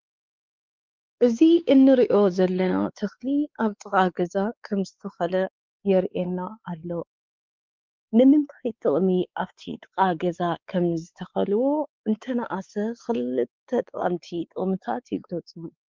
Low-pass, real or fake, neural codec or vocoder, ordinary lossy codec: 7.2 kHz; fake; codec, 16 kHz, 4 kbps, X-Codec, WavLM features, trained on Multilingual LibriSpeech; Opus, 24 kbps